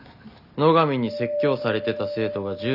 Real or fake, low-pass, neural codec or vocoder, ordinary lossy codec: real; 5.4 kHz; none; none